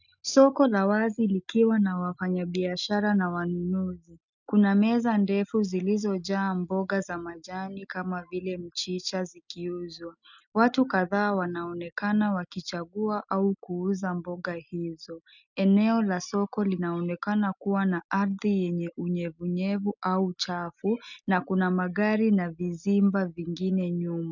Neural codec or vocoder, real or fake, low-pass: none; real; 7.2 kHz